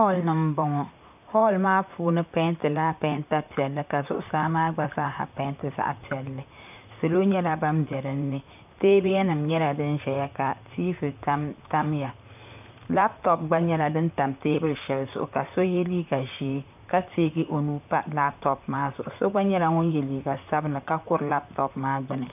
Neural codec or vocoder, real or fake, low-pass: vocoder, 44.1 kHz, 128 mel bands, Pupu-Vocoder; fake; 3.6 kHz